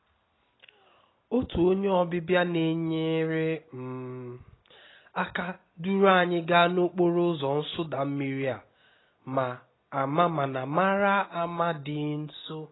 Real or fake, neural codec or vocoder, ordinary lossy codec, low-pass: real; none; AAC, 16 kbps; 7.2 kHz